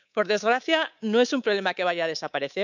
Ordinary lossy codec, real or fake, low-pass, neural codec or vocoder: none; fake; 7.2 kHz; codec, 24 kHz, 3.1 kbps, DualCodec